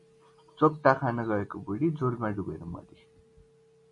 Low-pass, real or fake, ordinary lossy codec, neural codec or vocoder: 10.8 kHz; real; MP3, 48 kbps; none